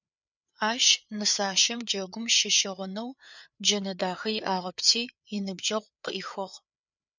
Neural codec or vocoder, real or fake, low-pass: codec, 16 kHz, 4 kbps, FreqCodec, larger model; fake; 7.2 kHz